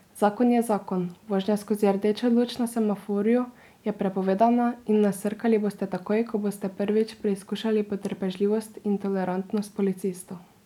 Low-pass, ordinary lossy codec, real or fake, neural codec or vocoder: 19.8 kHz; none; real; none